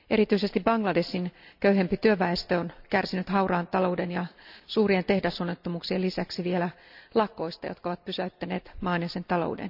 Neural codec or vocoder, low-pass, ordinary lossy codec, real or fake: none; 5.4 kHz; none; real